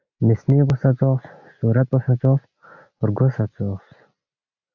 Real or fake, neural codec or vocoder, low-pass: real; none; 7.2 kHz